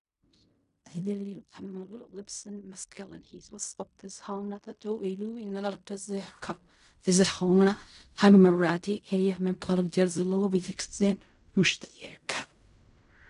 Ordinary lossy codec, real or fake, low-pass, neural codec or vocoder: MP3, 96 kbps; fake; 10.8 kHz; codec, 16 kHz in and 24 kHz out, 0.4 kbps, LongCat-Audio-Codec, fine tuned four codebook decoder